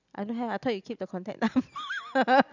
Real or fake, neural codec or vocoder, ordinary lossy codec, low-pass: real; none; none; 7.2 kHz